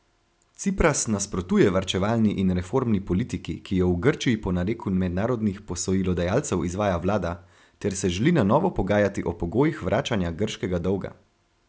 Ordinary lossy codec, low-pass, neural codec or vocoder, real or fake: none; none; none; real